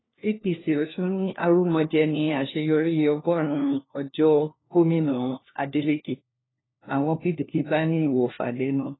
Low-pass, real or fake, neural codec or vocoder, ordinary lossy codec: 7.2 kHz; fake; codec, 16 kHz, 1 kbps, FunCodec, trained on LibriTTS, 50 frames a second; AAC, 16 kbps